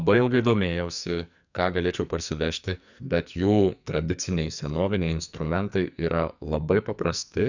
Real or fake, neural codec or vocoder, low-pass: fake; codec, 44.1 kHz, 2.6 kbps, SNAC; 7.2 kHz